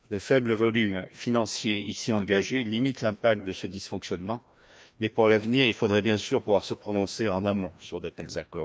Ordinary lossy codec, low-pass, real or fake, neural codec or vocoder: none; none; fake; codec, 16 kHz, 1 kbps, FreqCodec, larger model